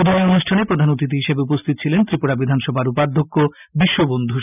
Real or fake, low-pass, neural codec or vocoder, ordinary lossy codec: real; 3.6 kHz; none; none